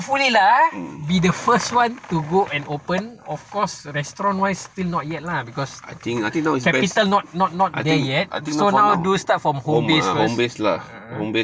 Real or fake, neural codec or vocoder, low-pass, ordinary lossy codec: real; none; none; none